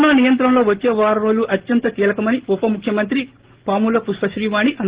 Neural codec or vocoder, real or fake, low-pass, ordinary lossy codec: none; real; 3.6 kHz; Opus, 16 kbps